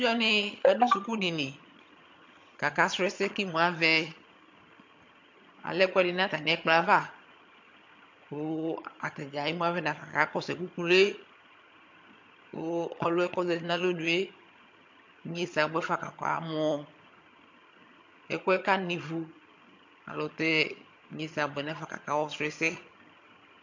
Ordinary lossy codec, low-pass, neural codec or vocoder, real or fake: MP3, 48 kbps; 7.2 kHz; vocoder, 22.05 kHz, 80 mel bands, HiFi-GAN; fake